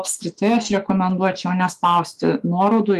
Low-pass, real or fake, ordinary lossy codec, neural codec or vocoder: 14.4 kHz; fake; Opus, 32 kbps; autoencoder, 48 kHz, 128 numbers a frame, DAC-VAE, trained on Japanese speech